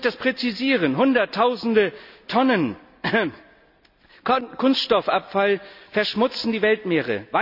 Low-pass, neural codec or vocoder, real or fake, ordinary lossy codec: 5.4 kHz; none; real; none